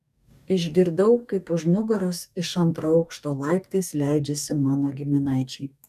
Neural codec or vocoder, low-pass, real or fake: codec, 44.1 kHz, 2.6 kbps, DAC; 14.4 kHz; fake